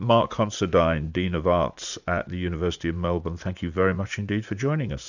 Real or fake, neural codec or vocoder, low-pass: fake; vocoder, 44.1 kHz, 80 mel bands, Vocos; 7.2 kHz